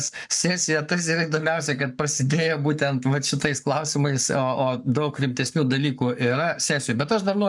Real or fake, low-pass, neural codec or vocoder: fake; 10.8 kHz; codec, 44.1 kHz, 7.8 kbps, Pupu-Codec